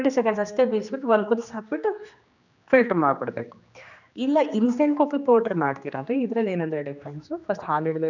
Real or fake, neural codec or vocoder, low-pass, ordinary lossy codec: fake; codec, 16 kHz, 2 kbps, X-Codec, HuBERT features, trained on general audio; 7.2 kHz; none